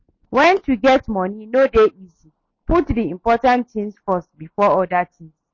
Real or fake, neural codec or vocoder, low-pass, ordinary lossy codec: real; none; 7.2 kHz; MP3, 32 kbps